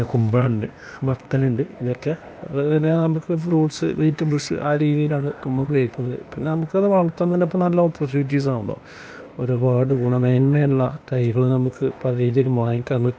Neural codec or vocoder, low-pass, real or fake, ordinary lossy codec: codec, 16 kHz, 0.8 kbps, ZipCodec; none; fake; none